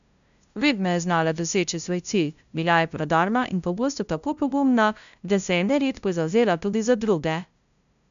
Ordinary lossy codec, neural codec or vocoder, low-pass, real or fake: MP3, 96 kbps; codec, 16 kHz, 0.5 kbps, FunCodec, trained on LibriTTS, 25 frames a second; 7.2 kHz; fake